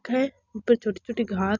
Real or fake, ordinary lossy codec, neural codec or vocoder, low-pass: real; none; none; 7.2 kHz